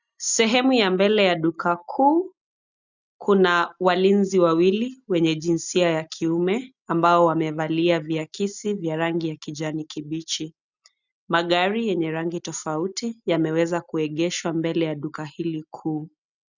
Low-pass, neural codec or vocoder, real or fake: 7.2 kHz; none; real